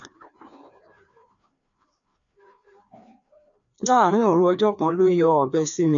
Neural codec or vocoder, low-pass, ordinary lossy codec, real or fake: codec, 16 kHz, 2 kbps, FreqCodec, larger model; 7.2 kHz; none; fake